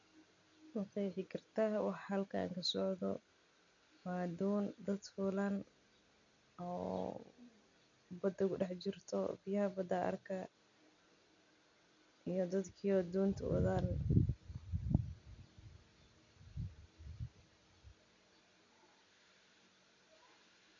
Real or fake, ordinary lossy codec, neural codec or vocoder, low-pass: real; none; none; 7.2 kHz